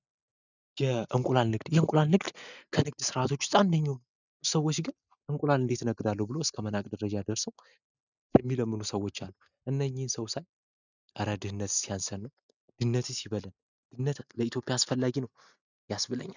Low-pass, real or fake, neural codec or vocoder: 7.2 kHz; real; none